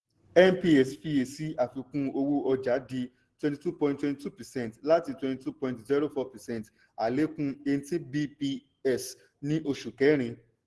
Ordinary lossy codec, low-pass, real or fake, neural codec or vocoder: Opus, 16 kbps; 10.8 kHz; real; none